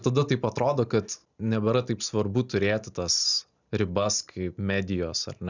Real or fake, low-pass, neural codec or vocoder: real; 7.2 kHz; none